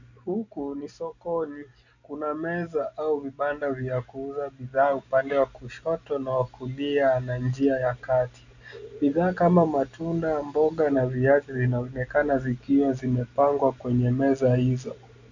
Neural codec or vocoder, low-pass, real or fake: none; 7.2 kHz; real